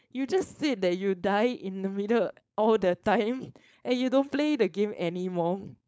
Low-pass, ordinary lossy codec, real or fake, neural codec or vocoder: none; none; fake; codec, 16 kHz, 4.8 kbps, FACodec